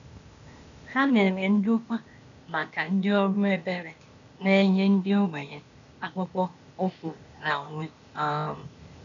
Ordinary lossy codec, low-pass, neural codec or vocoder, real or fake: MP3, 96 kbps; 7.2 kHz; codec, 16 kHz, 0.8 kbps, ZipCodec; fake